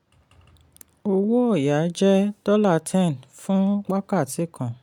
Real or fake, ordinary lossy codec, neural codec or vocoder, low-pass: real; none; none; 19.8 kHz